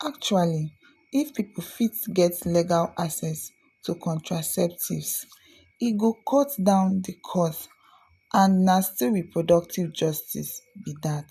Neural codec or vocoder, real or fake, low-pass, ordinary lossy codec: none; real; 14.4 kHz; none